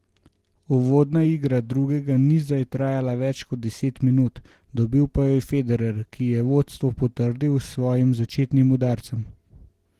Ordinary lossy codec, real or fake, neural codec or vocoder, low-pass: Opus, 16 kbps; real; none; 14.4 kHz